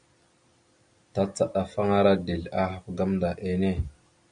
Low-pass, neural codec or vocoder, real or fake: 9.9 kHz; none; real